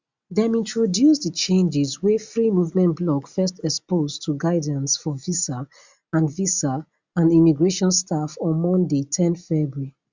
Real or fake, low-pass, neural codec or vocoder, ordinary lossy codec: real; 7.2 kHz; none; Opus, 64 kbps